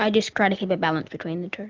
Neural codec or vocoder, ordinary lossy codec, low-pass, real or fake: none; Opus, 32 kbps; 7.2 kHz; real